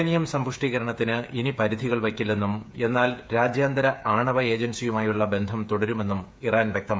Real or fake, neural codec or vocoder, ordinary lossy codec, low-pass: fake; codec, 16 kHz, 8 kbps, FreqCodec, smaller model; none; none